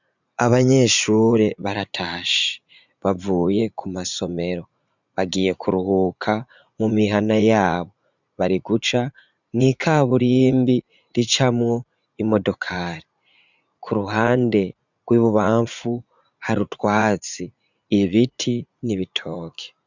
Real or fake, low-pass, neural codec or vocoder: fake; 7.2 kHz; vocoder, 44.1 kHz, 80 mel bands, Vocos